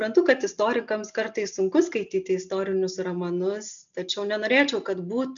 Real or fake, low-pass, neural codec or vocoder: real; 7.2 kHz; none